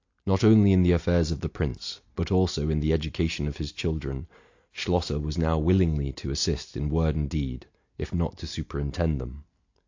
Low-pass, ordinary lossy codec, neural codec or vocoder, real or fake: 7.2 kHz; AAC, 48 kbps; none; real